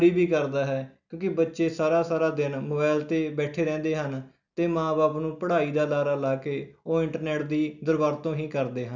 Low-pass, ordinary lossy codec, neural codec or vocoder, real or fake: 7.2 kHz; none; none; real